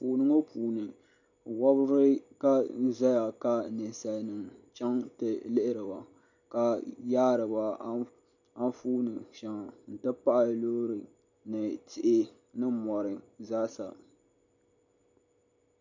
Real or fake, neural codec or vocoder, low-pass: real; none; 7.2 kHz